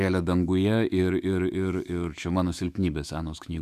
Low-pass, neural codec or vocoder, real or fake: 14.4 kHz; autoencoder, 48 kHz, 128 numbers a frame, DAC-VAE, trained on Japanese speech; fake